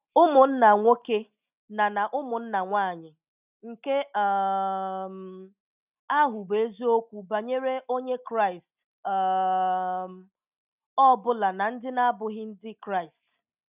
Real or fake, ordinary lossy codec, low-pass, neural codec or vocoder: real; none; 3.6 kHz; none